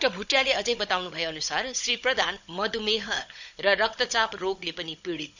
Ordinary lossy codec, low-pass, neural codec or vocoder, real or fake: none; 7.2 kHz; codec, 16 kHz, 16 kbps, FunCodec, trained on Chinese and English, 50 frames a second; fake